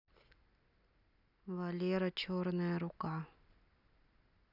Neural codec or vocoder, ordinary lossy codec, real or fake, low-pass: none; none; real; 5.4 kHz